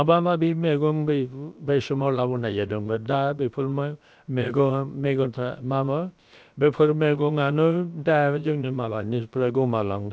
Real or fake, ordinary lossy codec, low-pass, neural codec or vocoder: fake; none; none; codec, 16 kHz, about 1 kbps, DyCAST, with the encoder's durations